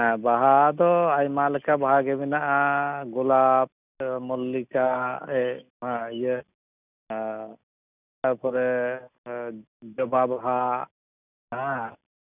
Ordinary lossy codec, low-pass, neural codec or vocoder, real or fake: none; 3.6 kHz; none; real